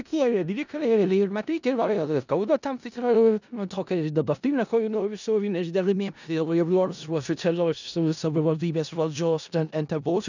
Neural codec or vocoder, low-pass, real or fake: codec, 16 kHz in and 24 kHz out, 0.4 kbps, LongCat-Audio-Codec, four codebook decoder; 7.2 kHz; fake